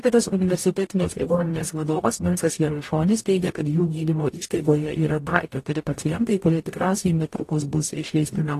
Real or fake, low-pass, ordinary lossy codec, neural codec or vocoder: fake; 14.4 kHz; AAC, 48 kbps; codec, 44.1 kHz, 0.9 kbps, DAC